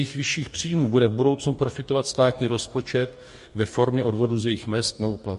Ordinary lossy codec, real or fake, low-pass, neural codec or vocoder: MP3, 48 kbps; fake; 14.4 kHz; codec, 44.1 kHz, 2.6 kbps, DAC